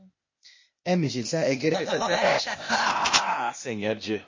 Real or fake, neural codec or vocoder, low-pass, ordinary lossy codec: fake; codec, 16 kHz, 0.8 kbps, ZipCodec; 7.2 kHz; MP3, 32 kbps